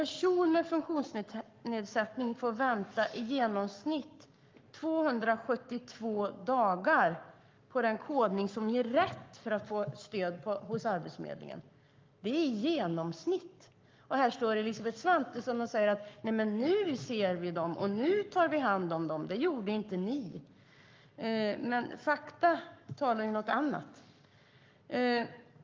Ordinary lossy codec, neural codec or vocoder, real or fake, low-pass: Opus, 24 kbps; codec, 44.1 kHz, 7.8 kbps, Pupu-Codec; fake; 7.2 kHz